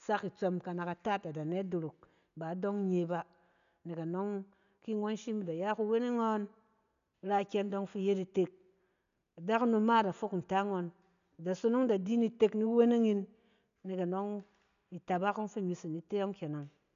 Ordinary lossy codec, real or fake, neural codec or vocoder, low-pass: none; real; none; 7.2 kHz